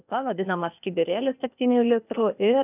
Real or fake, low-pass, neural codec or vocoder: fake; 3.6 kHz; codec, 16 kHz, 0.8 kbps, ZipCodec